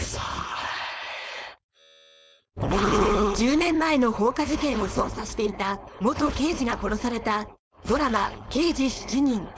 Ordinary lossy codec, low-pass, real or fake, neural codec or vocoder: none; none; fake; codec, 16 kHz, 4.8 kbps, FACodec